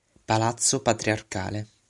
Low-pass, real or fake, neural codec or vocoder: 10.8 kHz; real; none